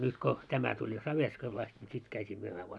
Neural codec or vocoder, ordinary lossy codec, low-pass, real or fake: none; none; none; real